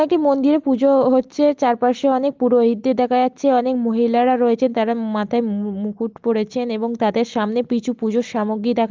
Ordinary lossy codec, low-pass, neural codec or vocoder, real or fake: Opus, 32 kbps; 7.2 kHz; none; real